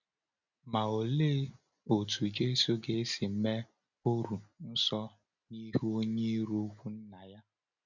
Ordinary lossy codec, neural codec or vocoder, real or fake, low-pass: none; none; real; 7.2 kHz